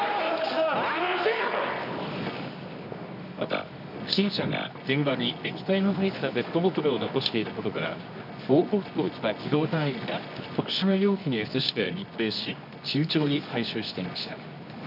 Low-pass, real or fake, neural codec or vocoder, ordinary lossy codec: 5.4 kHz; fake; codec, 24 kHz, 0.9 kbps, WavTokenizer, medium music audio release; none